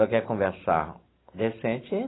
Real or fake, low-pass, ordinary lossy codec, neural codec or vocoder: real; 7.2 kHz; AAC, 16 kbps; none